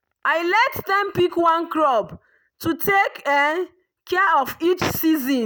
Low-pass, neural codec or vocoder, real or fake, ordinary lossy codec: none; none; real; none